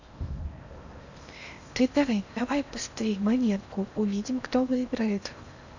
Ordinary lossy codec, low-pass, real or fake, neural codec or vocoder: none; 7.2 kHz; fake; codec, 16 kHz in and 24 kHz out, 0.8 kbps, FocalCodec, streaming, 65536 codes